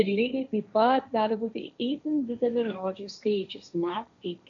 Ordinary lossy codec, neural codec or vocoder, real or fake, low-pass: MP3, 96 kbps; codec, 16 kHz, 1.1 kbps, Voila-Tokenizer; fake; 7.2 kHz